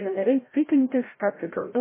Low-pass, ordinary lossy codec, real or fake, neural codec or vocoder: 3.6 kHz; MP3, 16 kbps; fake; codec, 16 kHz, 0.5 kbps, FreqCodec, larger model